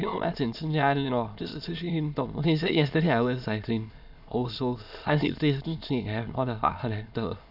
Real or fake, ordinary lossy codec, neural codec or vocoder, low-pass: fake; none; autoencoder, 22.05 kHz, a latent of 192 numbers a frame, VITS, trained on many speakers; 5.4 kHz